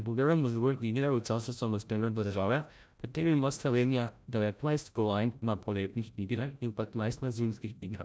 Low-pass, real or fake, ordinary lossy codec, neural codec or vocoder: none; fake; none; codec, 16 kHz, 0.5 kbps, FreqCodec, larger model